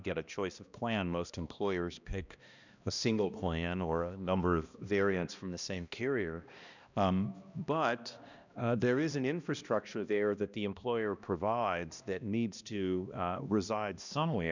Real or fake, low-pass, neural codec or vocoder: fake; 7.2 kHz; codec, 16 kHz, 1 kbps, X-Codec, HuBERT features, trained on balanced general audio